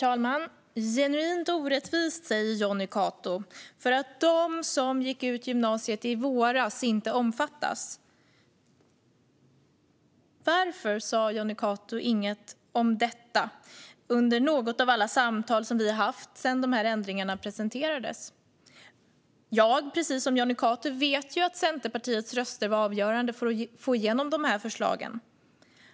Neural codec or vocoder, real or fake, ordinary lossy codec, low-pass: none; real; none; none